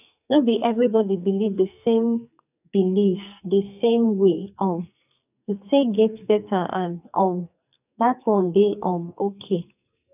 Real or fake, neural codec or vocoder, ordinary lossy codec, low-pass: fake; codec, 44.1 kHz, 2.6 kbps, SNAC; none; 3.6 kHz